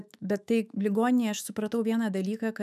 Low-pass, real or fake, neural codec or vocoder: 14.4 kHz; fake; autoencoder, 48 kHz, 128 numbers a frame, DAC-VAE, trained on Japanese speech